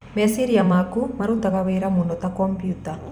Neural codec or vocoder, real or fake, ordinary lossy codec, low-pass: vocoder, 44.1 kHz, 128 mel bands every 256 samples, BigVGAN v2; fake; none; 19.8 kHz